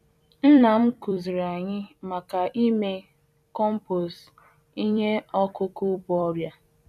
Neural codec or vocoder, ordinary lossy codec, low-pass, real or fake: vocoder, 44.1 kHz, 128 mel bands every 256 samples, BigVGAN v2; none; 14.4 kHz; fake